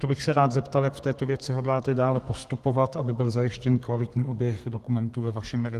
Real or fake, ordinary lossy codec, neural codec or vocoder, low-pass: fake; Opus, 24 kbps; codec, 32 kHz, 1.9 kbps, SNAC; 14.4 kHz